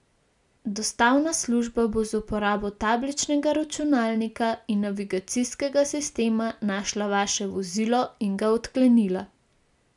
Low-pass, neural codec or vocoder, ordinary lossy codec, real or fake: 10.8 kHz; none; none; real